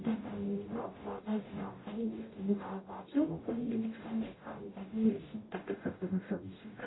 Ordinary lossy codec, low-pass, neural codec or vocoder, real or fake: AAC, 16 kbps; 7.2 kHz; codec, 44.1 kHz, 0.9 kbps, DAC; fake